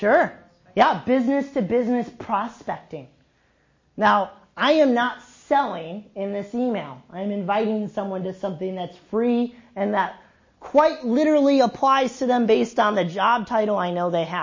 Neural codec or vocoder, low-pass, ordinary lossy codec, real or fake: none; 7.2 kHz; MP3, 32 kbps; real